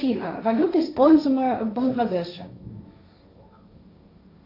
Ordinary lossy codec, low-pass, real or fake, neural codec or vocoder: AAC, 32 kbps; 5.4 kHz; fake; codec, 16 kHz, 1.1 kbps, Voila-Tokenizer